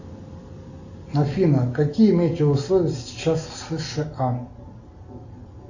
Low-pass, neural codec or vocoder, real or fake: 7.2 kHz; none; real